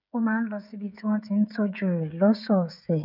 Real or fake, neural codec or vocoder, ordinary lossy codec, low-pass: fake; codec, 16 kHz, 16 kbps, FreqCodec, smaller model; none; 5.4 kHz